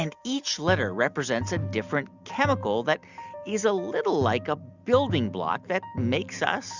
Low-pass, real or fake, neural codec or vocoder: 7.2 kHz; real; none